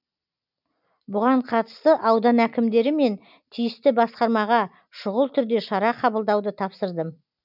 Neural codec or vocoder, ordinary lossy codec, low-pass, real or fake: none; none; 5.4 kHz; real